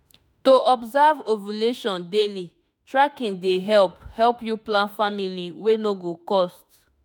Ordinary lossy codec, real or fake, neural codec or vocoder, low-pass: none; fake; autoencoder, 48 kHz, 32 numbers a frame, DAC-VAE, trained on Japanese speech; none